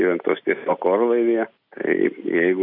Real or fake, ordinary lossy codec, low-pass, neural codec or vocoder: real; MP3, 48 kbps; 5.4 kHz; none